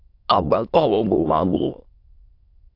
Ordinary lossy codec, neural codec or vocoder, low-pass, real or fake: AAC, 32 kbps; autoencoder, 22.05 kHz, a latent of 192 numbers a frame, VITS, trained on many speakers; 5.4 kHz; fake